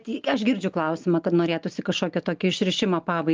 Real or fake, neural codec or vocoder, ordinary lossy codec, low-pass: real; none; Opus, 32 kbps; 7.2 kHz